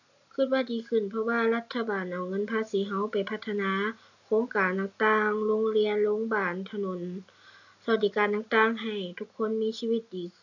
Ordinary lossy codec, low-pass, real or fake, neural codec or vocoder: none; 7.2 kHz; real; none